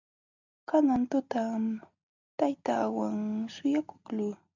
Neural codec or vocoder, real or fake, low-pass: none; real; 7.2 kHz